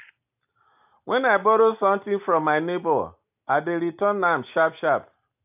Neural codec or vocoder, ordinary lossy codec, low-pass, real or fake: none; AAC, 32 kbps; 3.6 kHz; real